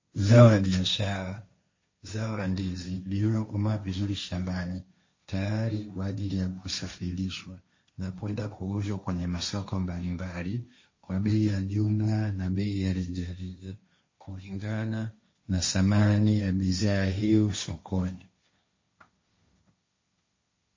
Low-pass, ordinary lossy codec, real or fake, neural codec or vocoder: 7.2 kHz; MP3, 32 kbps; fake; codec, 16 kHz, 1.1 kbps, Voila-Tokenizer